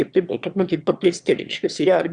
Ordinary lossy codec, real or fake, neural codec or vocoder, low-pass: Opus, 64 kbps; fake; autoencoder, 22.05 kHz, a latent of 192 numbers a frame, VITS, trained on one speaker; 9.9 kHz